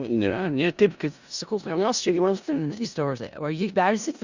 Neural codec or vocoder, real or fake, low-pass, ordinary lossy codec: codec, 16 kHz in and 24 kHz out, 0.4 kbps, LongCat-Audio-Codec, four codebook decoder; fake; 7.2 kHz; Opus, 64 kbps